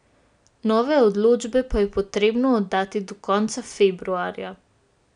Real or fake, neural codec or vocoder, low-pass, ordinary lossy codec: real; none; 9.9 kHz; none